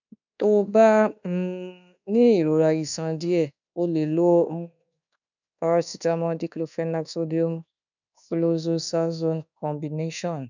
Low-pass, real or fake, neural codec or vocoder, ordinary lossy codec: 7.2 kHz; fake; codec, 24 kHz, 1.2 kbps, DualCodec; none